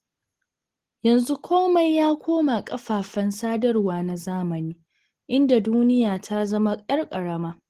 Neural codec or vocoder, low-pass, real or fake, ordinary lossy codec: none; 14.4 kHz; real; Opus, 16 kbps